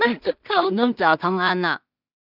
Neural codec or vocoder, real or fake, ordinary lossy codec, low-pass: codec, 16 kHz in and 24 kHz out, 0.4 kbps, LongCat-Audio-Codec, two codebook decoder; fake; none; 5.4 kHz